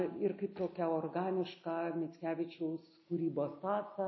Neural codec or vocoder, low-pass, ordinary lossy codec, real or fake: none; 7.2 kHz; MP3, 24 kbps; real